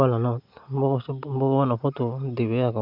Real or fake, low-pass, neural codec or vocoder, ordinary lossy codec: real; 5.4 kHz; none; none